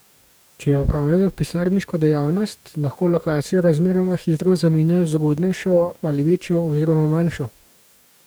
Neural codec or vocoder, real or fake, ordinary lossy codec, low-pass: codec, 44.1 kHz, 2.6 kbps, DAC; fake; none; none